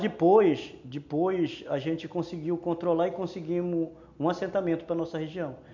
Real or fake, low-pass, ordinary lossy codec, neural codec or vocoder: real; 7.2 kHz; none; none